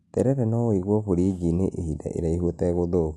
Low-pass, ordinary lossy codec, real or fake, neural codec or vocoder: none; none; real; none